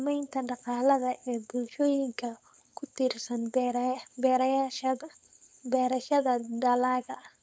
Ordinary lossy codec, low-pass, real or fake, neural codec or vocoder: none; none; fake; codec, 16 kHz, 4.8 kbps, FACodec